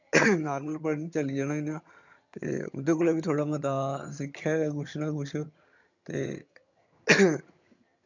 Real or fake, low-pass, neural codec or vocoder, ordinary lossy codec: fake; 7.2 kHz; vocoder, 22.05 kHz, 80 mel bands, HiFi-GAN; none